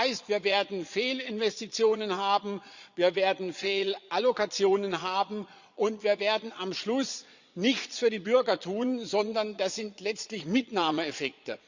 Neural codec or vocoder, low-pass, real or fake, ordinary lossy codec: vocoder, 22.05 kHz, 80 mel bands, Vocos; 7.2 kHz; fake; Opus, 64 kbps